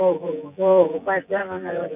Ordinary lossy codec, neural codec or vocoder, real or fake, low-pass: none; vocoder, 24 kHz, 100 mel bands, Vocos; fake; 3.6 kHz